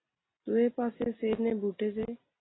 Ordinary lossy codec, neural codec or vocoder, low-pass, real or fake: AAC, 16 kbps; none; 7.2 kHz; real